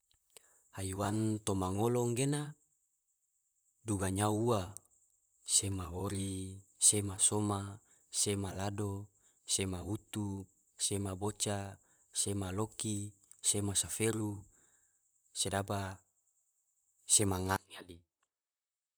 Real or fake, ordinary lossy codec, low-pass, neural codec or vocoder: fake; none; none; vocoder, 44.1 kHz, 128 mel bands, Pupu-Vocoder